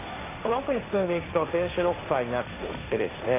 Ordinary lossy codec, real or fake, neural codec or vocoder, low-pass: AAC, 24 kbps; fake; codec, 16 kHz, 1.1 kbps, Voila-Tokenizer; 3.6 kHz